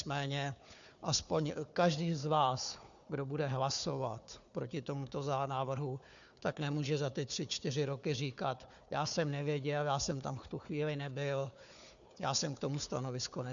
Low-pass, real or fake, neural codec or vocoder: 7.2 kHz; fake; codec, 16 kHz, 4 kbps, FunCodec, trained on Chinese and English, 50 frames a second